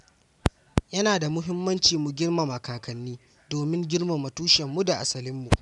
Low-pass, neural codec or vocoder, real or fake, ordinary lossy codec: 10.8 kHz; none; real; none